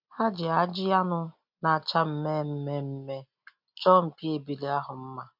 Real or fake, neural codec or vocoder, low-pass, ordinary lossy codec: real; none; 5.4 kHz; MP3, 48 kbps